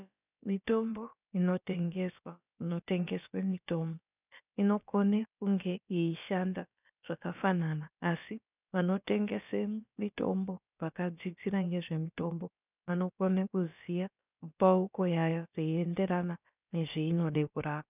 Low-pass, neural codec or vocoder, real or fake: 3.6 kHz; codec, 16 kHz, about 1 kbps, DyCAST, with the encoder's durations; fake